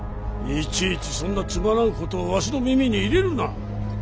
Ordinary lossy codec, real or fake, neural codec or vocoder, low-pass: none; real; none; none